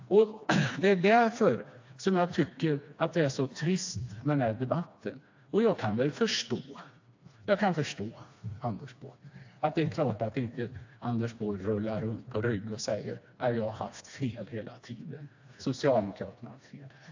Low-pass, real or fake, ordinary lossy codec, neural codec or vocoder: 7.2 kHz; fake; AAC, 48 kbps; codec, 16 kHz, 2 kbps, FreqCodec, smaller model